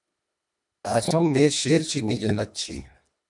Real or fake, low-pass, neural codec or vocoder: fake; 10.8 kHz; codec, 24 kHz, 1.5 kbps, HILCodec